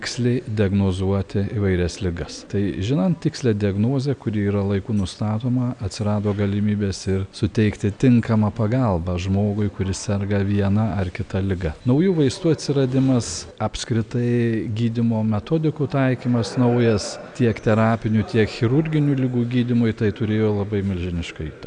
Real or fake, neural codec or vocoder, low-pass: real; none; 9.9 kHz